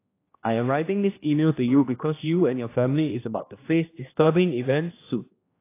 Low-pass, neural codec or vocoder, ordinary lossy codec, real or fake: 3.6 kHz; codec, 16 kHz, 1 kbps, X-Codec, HuBERT features, trained on general audio; AAC, 24 kbps; fake